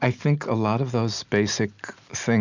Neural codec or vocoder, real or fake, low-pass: none; real; 7.2 kHz